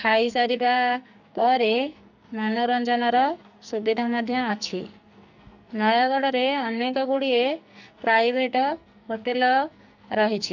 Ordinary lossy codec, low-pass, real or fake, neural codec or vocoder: none; 7.2 kHz; fake; codec, 44.1 kHz, 2.6 kbps, SNAC